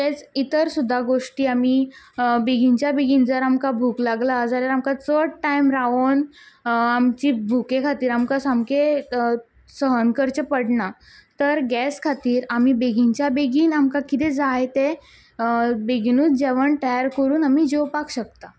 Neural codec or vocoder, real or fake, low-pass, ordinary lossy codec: none; real; none; none